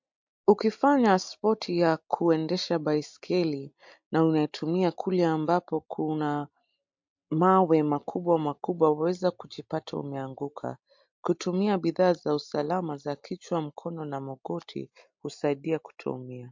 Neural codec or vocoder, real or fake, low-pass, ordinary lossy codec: none; real; 7.2 kHz; MP3, 48 kbps